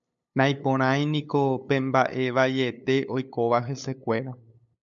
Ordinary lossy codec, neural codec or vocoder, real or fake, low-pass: MP3, 96 kbps; codec, 16 kHz, 8 kbps, FunCodec, trained on LibriTTS, 25 frames a second; fake; 7.2 kHz